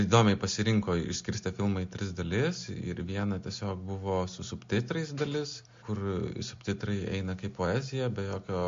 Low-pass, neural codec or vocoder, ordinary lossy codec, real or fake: 7.2 kHz; none; MP3, 48 kbps; real